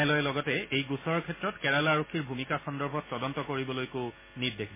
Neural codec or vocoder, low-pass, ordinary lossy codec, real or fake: none; 3.6 kHz; MP3, 16 kbps; real